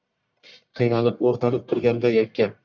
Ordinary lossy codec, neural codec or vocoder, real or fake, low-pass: MP3, 64 kbps; codec, 44.1 kHz, 1.7 kbps, Pupu-Codec; fake; 7.2 kHz